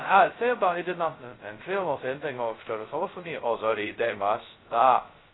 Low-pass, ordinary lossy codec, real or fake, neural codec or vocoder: 7.2 kHz; AAC, 16 kbps; fake; codec, 16 kHz, 0.2 kbps, FocalCodec